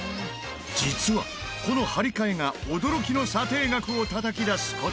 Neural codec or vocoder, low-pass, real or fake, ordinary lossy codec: none; none; real; none